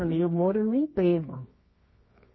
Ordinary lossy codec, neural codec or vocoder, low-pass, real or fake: MP3, 24 kbps; codec, 24 kHz, 0.9 kbps, WavTokenizer, medium music audio release; 7.2 kHz; fake